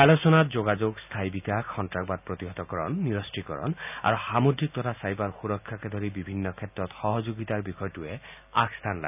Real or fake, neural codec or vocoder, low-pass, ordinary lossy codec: real; none; 3.6 kHz; none